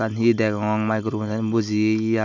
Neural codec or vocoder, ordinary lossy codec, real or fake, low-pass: none; none; real; 7.2 kHz